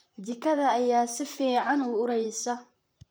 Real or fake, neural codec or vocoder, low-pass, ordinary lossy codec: fake; vocoder, 44.1 kHz, 128 mel bands, Pupu-Vocoder; none; none